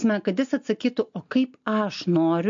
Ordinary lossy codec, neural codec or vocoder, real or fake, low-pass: MP3, 48 kbps; none; real; 7.2 kHz